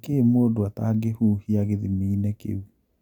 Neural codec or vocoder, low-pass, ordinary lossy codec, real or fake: none; 19.8 kHz; none; real